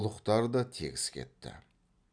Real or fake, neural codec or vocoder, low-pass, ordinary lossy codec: real; none; 9.9 kHz; none